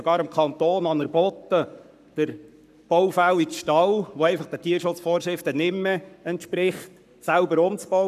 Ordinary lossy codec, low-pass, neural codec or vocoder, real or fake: none; 14.4 kHz; codec, 44.1 kHz, 7.8 kbps, Pupu-Codec; fake